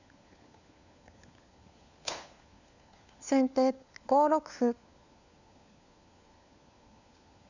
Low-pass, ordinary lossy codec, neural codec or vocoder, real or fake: 7.2 kHz; none; codec, 16 kHz, 4 kbps, FunCodec, trained on LibriTTS, 50 frames a second; fake